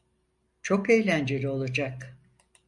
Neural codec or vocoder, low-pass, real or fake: none; 10.8 kHz; real